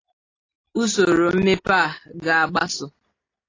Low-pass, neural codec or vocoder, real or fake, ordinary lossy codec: 7.2 kHz; none; real; AAC, 32 kbps